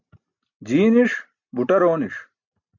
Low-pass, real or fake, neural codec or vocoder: 7.2 kHz; real; none